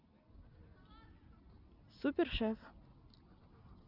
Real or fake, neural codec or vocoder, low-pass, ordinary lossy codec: real; none; 5.4 kHz; none